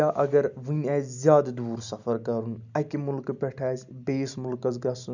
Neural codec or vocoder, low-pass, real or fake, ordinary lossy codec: none; 7.2 kHz; real; none